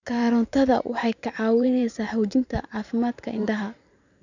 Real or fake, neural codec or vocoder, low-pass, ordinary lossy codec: fake; vocoder, 44.1 kHz, 128 mel bands every 512 samples, BigVGAN v2; 7.2 kHz; none